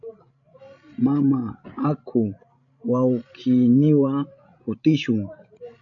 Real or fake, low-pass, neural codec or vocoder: fake; 7.2 kHz; codec, 16 kHz, 16 kbps, FreqCodec, larger model